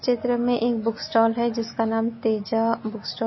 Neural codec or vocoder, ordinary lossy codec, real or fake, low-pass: none; MP3, 24 kbps; real; 7.2 kHz